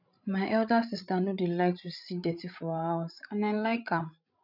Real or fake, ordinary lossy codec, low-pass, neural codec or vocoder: fake; none; 5.4 kHz; codec, 16 kHz, 16 kbps, FreqCodec, larger model